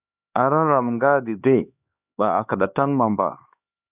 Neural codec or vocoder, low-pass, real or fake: codec, 16 kHz, 2 kbps, X-Codec, HuBERT features, trained on LibriSpeech; 3.6 kHz; fake